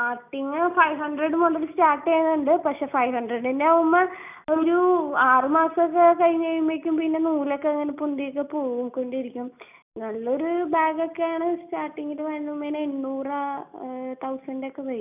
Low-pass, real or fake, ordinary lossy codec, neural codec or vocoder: 3.6 kHz; real; none; none